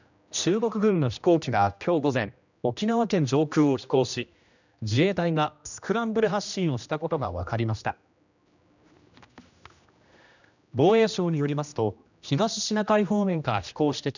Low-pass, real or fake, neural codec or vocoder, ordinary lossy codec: 7.2 kHz; fake; codec, 16 kHz, 1 kbps, X-Codec, HuBERT features, trained on general audio; none